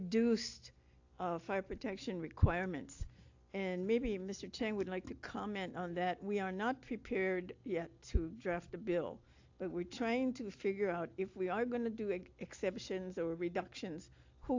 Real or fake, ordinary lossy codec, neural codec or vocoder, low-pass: real; AAC, 48 kbps; none; 7.2 kHz